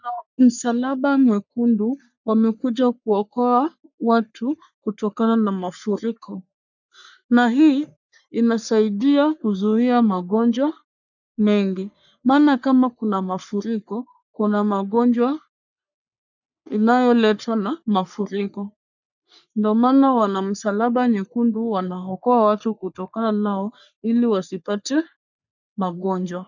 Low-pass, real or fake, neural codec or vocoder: 7.2 kHz; fake; codec, 44.1 kHz, 3.4 kbps, Pupu-Codec